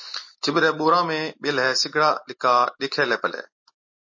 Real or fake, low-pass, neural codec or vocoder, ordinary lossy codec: real; 7.2 kHz; none; MP3, 32 kbps